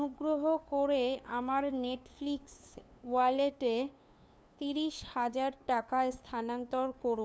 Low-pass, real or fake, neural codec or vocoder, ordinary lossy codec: none; fake; codec, 16 kHz, 2 kbps, FunCodec, trained on LibriTTS, 25 frames a second; none